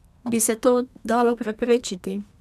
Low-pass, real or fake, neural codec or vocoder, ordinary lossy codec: 14.4 kHz; fake; codec, 32 kHz, 1.9 kbps, SNAC; none